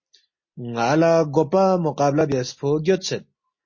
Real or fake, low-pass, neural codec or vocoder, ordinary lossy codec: real; 7.2 kHz; none; MP3, 32 kbps